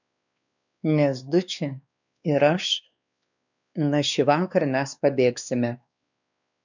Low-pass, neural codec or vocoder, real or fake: 7.2 kHz; codec, 16 kHz, 2 kbps, X-Codec, WavLM features, trained on Multilingual LibriSpeech; fake